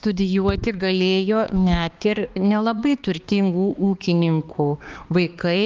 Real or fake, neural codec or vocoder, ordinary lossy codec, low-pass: fake; codec, 16 kHz, 2 kbps, X-Codec, HuBERT features, trained on balanced general audio; Opus, 32 kbps; 7.2 kHz